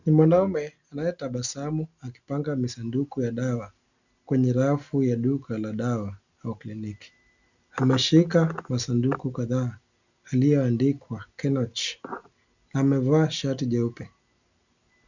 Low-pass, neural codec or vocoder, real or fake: 7.2 kHz; none; real